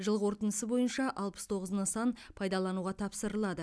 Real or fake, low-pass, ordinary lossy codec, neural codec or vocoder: real; none; none; none